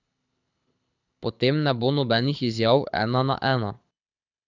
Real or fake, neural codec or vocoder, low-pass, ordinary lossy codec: fake; codec, 24 kHz, 6 kbps, HILCodec; 7.2 kHz; none